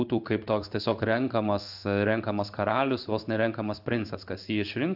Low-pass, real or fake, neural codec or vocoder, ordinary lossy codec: 5.4 kHz; fake; codec, 16 kHz in and 24 kHz out, 1 kbps, XY-Tokenizer; AAC, 48 kbps